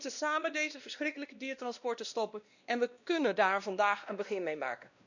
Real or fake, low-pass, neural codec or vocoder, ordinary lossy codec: fake; 7.2 kHz; codec, 16 kHz, 1 kbps, X-Codec, WavLM features, trained on Multilingual LibriSpeech; none